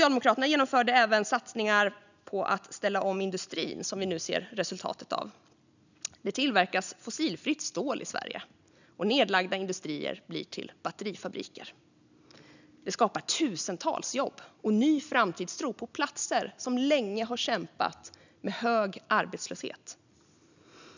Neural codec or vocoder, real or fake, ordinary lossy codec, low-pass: none; real; none; 7.2 kHz